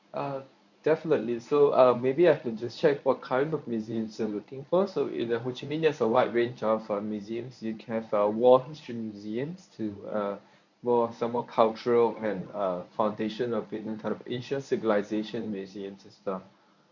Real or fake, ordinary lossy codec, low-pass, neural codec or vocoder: fake; none; 7.2 kHz; codec, 24 kHz, 0.9 kbps, WavTokenizer, medium speech release version 1